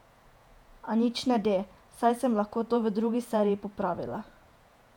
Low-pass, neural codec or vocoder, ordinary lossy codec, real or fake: 19.8 kHz; vocoder, 48 kHz, 128 mel bands, Vocos; none; fake